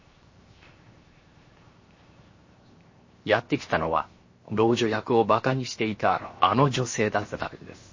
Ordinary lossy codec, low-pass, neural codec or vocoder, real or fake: MP3, 32 kbps; 7.2 kHz; codec, 16 kHz, 0.7 kbps, FocalCodec; fake